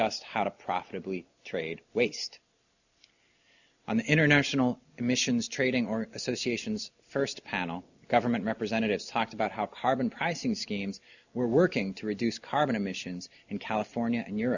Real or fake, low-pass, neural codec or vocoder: real; 7.2 kHz; none